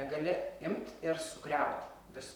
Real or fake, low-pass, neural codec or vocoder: fake; 19.8 kHz; vocoder, 44.1 kHz, 128 mel bands, Pupu-Vocoder